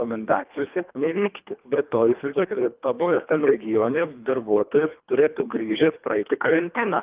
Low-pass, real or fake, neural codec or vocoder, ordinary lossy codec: 3.6 kHz; fake; codec, 24 kHz, 1.5 kbps, HILCodec; Opus, 24 kbps